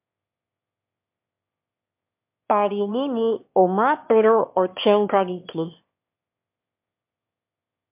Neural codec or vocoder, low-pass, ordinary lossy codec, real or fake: autoencoder, 22.05 kHz, a latent of 192 numbers a frame, VITS, trained on one speaker; 3.6 kHz; MP3, 32 kbps; fake